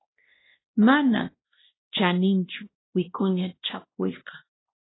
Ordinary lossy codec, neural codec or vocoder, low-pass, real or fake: AAC, 16 kbps; codec, 16 kHz, 1 kbps, X-Codec, WavLM features, trained on Multilingual LibriSpeech; 7.2 kHz; fake